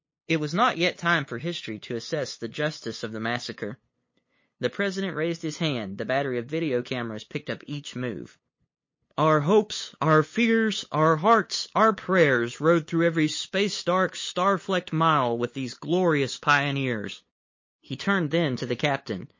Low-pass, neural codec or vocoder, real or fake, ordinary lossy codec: 7.2 kHz; codec, 16 kHz, 8 kbps, FunCodec, trained on LibriTTS, 25 frames a second; fake; MP3, 32 kbps